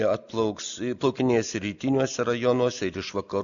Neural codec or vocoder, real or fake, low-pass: none; real; 7.2 kHz